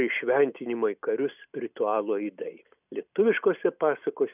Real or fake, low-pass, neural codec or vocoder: real; 3.6 kHz; none